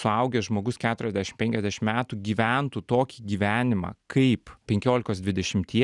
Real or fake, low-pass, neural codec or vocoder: real; 10.8 kHz; none